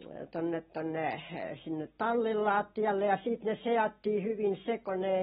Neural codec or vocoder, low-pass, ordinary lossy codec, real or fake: none; 10.8 kHz; AAC, 16 kbps; real